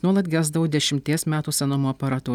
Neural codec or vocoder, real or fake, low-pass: none; real; 19.8 kHz